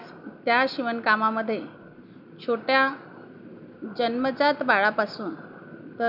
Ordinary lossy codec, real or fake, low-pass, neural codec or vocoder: none; real; 5.4 kHz; none